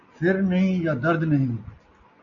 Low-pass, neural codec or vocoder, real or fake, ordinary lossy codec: 7.2 kHz; none; real; MP3, 64 kbps